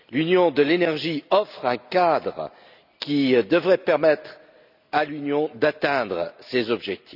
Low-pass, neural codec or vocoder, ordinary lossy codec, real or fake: 5.4 kHz; none; none; real